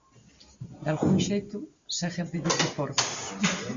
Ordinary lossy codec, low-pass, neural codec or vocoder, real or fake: Opus, 64 kbps; 7.2 kHz; none; real